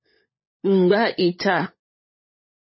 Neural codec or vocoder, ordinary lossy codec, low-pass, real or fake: codec, 16 kHz, 16 kbps, FunCodec, trained on LibriTTS, 50 frames a second; MP3, 24 kbps; 7.2 kHz; fake